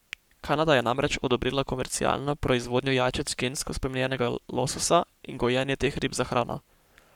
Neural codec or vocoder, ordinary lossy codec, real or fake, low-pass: codec, 44.1 kHz, 7.8 kbps, Pupu-Codec; none; fake; 19.8 kHz